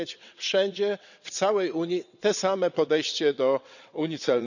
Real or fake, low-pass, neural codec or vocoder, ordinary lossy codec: fake; 7.2 kHz; vocoder, 22.05 kHz, 80 mel bands, WaveNeXt; none